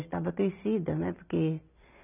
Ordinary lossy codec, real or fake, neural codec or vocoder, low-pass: none; real; none; 3.6 kHz